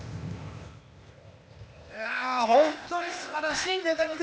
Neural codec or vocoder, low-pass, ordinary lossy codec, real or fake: codec, 16 kHz, 0.8 kbps, ZipCodec; none; none; fake